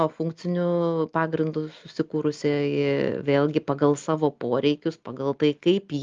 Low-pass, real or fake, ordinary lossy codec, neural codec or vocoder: 7.2 kHz; real; Opus, 32 kbps; none